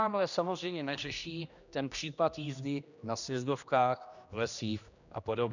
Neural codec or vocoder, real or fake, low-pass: codec, 16 kHz, 1 kbps, X-Codec, HuBERT features, trained on general audio; fake; 7.2 kHz